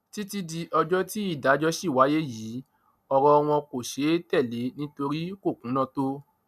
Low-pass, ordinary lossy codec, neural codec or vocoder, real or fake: 14.4 kHz; none; none; real